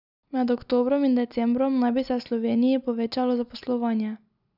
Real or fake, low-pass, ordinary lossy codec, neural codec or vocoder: real; 5.4 kHz; none; none